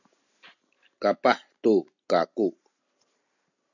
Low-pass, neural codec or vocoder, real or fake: 7.2 kHz; none; real